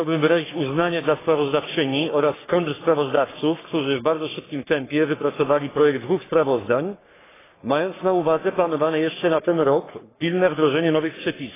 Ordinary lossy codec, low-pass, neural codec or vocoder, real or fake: AAC, 16 kbps; 3.6 kHz; codec, 44.1 kHz, 3.4 kbps, Pupu-Codec; fake